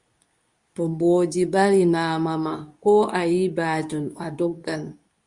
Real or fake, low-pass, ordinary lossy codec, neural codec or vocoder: fake; 10.8 kHz; Opus, 64 kbps; codec, 24 kHz, 0.9 kbps, WavTokenizer, medium speech release version 2